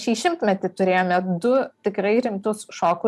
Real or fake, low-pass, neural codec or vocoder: real; 14.4 kHz; none